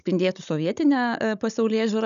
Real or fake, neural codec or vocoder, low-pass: fake; codec, 16 kHz, 16 kbps, FunCodec, trained on Chinese and English, 50 frames a second; 7.2 kHz